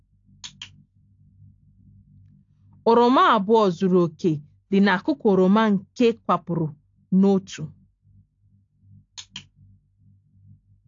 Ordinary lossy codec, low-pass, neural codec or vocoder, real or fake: AAC, 48 kbps; 7.2 kHz; none; real